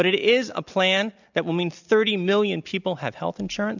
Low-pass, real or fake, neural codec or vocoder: 7.2 kHz; real; none